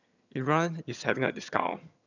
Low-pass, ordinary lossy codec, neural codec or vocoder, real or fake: 7.2 kHz; none; vocoder, 22.05 kHz, 80 mel bands, HiFi-GAN; fake